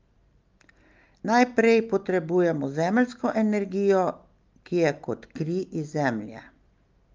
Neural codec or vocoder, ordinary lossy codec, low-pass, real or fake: none; Opus, 24 kbps; 7.2 kHz; real